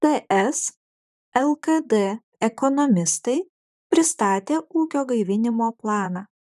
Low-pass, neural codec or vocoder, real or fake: 14.4 kHz; vocoder, 44.1 kHz, 128 mel bands, Pupu-Vocoder; fake